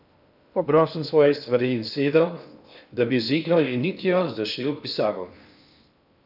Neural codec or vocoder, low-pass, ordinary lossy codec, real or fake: codec, 16 kHz in and 24 kHz out, 0.6 kbps, FocalCodec, streaming, 2048 codes; 5.4 kHz; none; fake